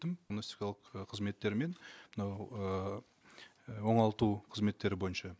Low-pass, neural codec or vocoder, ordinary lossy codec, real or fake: none; none; none; real